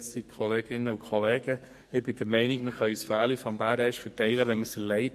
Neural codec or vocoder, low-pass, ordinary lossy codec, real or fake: codec, 44.1 kHz, 2.6 kbps, SNAC; 14.4 kHz; MP3, 64 kbps; fake